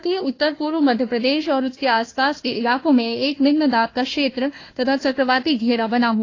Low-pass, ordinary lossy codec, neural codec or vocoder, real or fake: 7.2 kHz; AAC, 32 kbps; codec, 16 kHz, 1 kbps, FunCodec, trained on Chinese and English, 50 frames a second; fake